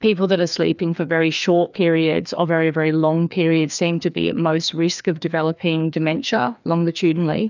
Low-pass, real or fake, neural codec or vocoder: 7.2 kHz; fake; codec, 16 kHz, 2 kbps, FreqCodec, larger model